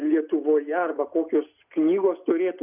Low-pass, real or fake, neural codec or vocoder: 3.6 kHz; real; none